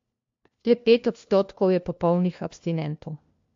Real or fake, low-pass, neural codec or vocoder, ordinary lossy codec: fake; 7.2 kHz; codec, 16 kHz, 1 kbps, FunCodec, trained on LibriTTS, 50 frames a second; MP3, 48 kbps